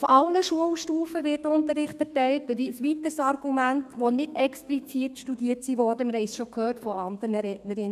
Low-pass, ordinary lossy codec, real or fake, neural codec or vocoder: 14.4 kHz; none; fake; codec, 32 kHz, 1.9 kbps, SNAC